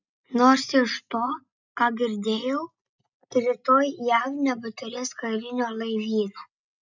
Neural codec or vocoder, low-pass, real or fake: none; 7.2 kHz; real